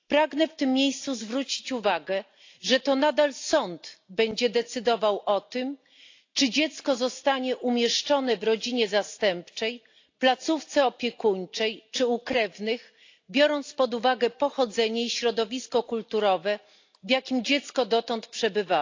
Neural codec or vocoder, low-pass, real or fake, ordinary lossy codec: none; 7.2 kHz; real; AAC, 48 kbps